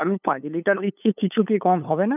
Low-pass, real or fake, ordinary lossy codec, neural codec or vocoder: 3.6 kHz; fake; none; codec, 16 kHz, 8 kbps, FunCodec, trained on LibriTTS, 25 frames a second